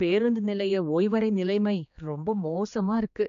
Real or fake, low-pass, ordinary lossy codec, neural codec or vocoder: fake; 7.2 kHz; AAC, 96 kbps; codec, 16 kHz, 2 kbps, X-Codec, HuBERT features, trained on general audio